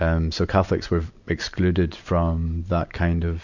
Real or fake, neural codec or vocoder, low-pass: real; none; 7.2 kHz